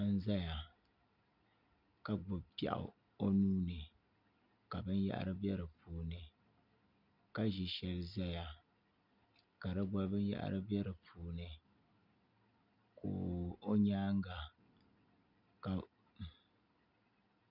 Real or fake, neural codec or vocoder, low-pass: real; none; 5.4 kHz